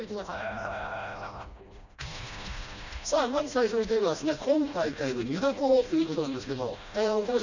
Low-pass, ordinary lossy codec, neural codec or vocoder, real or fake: 7.2 kHz; none; codec, 16 kHz, 1 kbps, FreqCodec, smaller model; fake